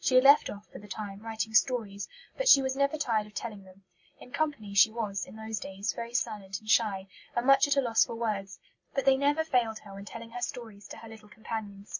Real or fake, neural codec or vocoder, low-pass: real; none; 7.2 kHz